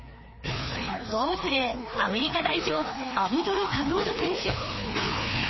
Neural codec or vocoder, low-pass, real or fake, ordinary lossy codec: codec, 16 kHz, 2 kbps, FreqCodec, larger model; 7.2 kHz; fake; MP3, 24 kbps